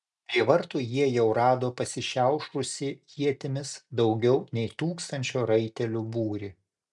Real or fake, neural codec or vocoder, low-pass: real; none; 10.8 kHz